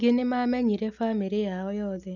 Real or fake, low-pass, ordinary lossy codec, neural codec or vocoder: real; 7.2 kHz; none; none